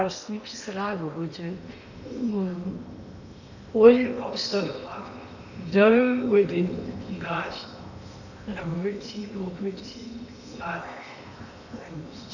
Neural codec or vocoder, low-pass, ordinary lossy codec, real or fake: codec, 16 kHz in and 24 kHz out, 0.8 kbps, FocalCodec, streaming, 65536 codes; 7.2 kHz; none; fake